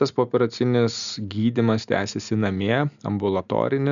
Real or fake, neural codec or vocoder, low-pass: real; none; 7.2 kHz